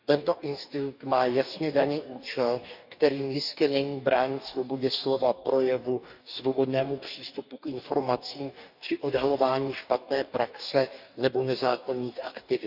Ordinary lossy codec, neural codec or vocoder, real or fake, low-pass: none; codec, 44.1 kHz, 2.6 kbps, DAC; fake; 5.4 kHz